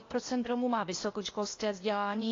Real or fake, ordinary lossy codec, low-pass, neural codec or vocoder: fake; AAC, 32 kbps; 7.2 kHz; codec, 16 kHz, 0.8 kbps, ZipCodec